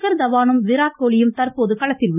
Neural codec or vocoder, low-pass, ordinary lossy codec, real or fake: none; 3.6 kHz; none; real